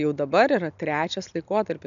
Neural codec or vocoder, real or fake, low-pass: none; real; 7.2 kHz